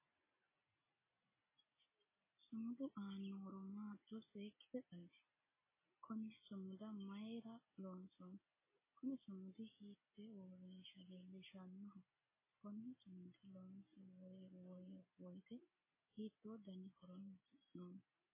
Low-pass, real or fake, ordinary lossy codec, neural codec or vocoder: 3.6 kHz; real; MP3, 16 kbps; none